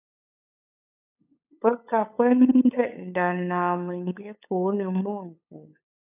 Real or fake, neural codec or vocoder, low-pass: fake; codec, 16 kHz, 8 kbps, FunCodec, trained on LibriTTS, 25 frames a second; 3.6 kHz